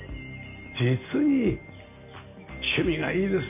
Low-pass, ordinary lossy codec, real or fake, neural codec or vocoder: 3.6 kHz; none; real; none